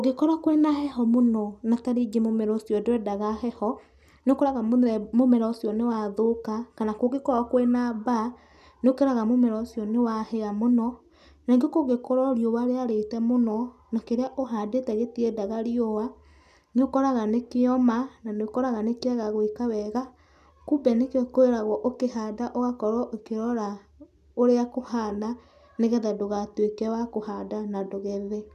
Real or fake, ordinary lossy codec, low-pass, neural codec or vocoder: real; none; 14.4 kHz; none